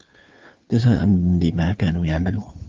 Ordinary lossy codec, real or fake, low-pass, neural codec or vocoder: Opus, 24 kbps; fake; 7.2 kHz; codec, 16 kHz, 2 kbps, FunCodec, trained on Chinese and English, 25 frames a second